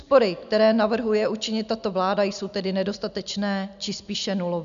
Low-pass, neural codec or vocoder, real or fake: 7.2 kHz; none; real